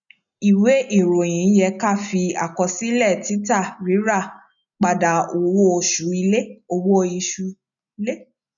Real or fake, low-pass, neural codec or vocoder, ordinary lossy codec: real; 7.2 kHz; none; none